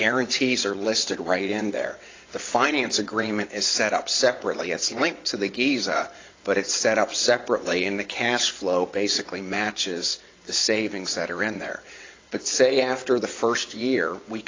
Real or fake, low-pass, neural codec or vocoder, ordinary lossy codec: fake; 7.2 kHz; vocoder, 22.05 kHz, 80 mel bands, WaveNeXt; AAC, 32 kbps